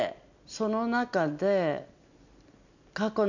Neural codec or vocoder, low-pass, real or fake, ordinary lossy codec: none; 7.2 kHz; real; none